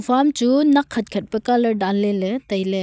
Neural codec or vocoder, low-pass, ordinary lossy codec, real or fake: none; none; none; real